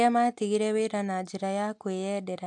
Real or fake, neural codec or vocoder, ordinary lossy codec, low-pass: real; none; AAC, 64 kbps; 10.8 kHz